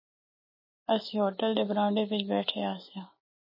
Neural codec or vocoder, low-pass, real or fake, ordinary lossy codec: none; 5.4 kHz; real; MP3, 24 kbps